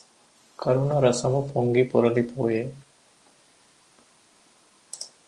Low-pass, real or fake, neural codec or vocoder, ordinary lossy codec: 10.8 kHz; real; none; Opus, 24 kbps